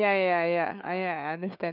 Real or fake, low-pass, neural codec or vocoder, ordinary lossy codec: fake; 5.4 kHz; codec, 16 kHz, 4 kbps, FunCodec, trained on LibriTTS, 50 frames a second; none